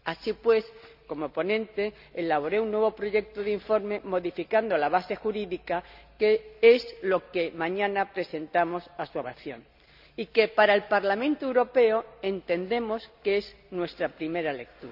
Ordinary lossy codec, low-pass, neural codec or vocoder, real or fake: none; 5.4 kHz; none; real